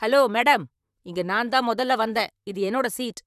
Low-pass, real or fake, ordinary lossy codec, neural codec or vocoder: 14.4 kHz; fake; none; vocoder, 44.1 kHz, 128 mel bands, Pupu-Vocoder